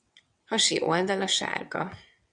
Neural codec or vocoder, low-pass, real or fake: vocoder, 22.05 kHz, 80 mel bands, WaveNeXt; 9.9 kHz; fake